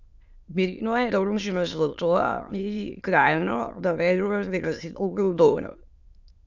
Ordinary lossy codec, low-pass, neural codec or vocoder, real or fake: Opus, 64 kbps; 7.2 kHz; autoencoder, 22.05 kHz, a latent of 192 numbers a frame, VITS, trained on many speakers; fake